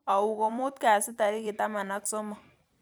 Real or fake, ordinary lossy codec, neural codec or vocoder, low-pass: fake; none; vocoder, 44.1 kHz, 128 mel bands every 256 samples, BigVGAN v2; none